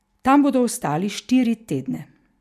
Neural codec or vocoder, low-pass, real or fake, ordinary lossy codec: none; 14.4 kHz; real; none